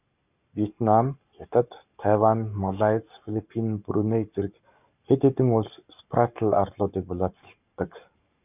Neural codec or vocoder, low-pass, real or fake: codec, 44.1 kHz, 7.8 kbps, Pupu-Codec; 3.6 kHz; fake